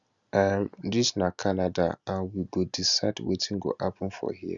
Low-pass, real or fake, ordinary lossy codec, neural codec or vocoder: 7.2 kHz; real; Opus, 64 kbps; none